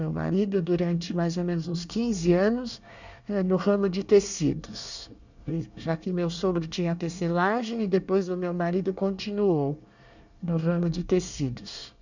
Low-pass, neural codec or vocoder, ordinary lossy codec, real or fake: 7.2 kHz; codec, 24 kHz, 1 kbps, SNAC; none; fake